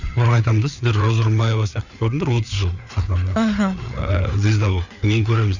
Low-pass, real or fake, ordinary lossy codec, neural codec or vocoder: 7.2 kHz; fake; none; codec, 16 kHz, 4 kbps, FreqCodec, larger model